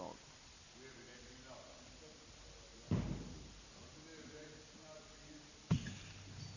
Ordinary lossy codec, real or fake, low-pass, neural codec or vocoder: none; real; 7.2 kHz; none